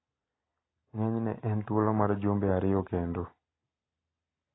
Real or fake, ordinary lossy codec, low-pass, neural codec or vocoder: real; AAC, 16 kbps; 7.2 kHz; none